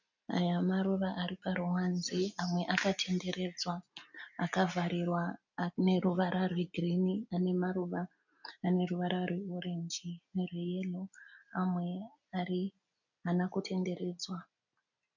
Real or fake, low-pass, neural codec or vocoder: real; 7.2 kHz; none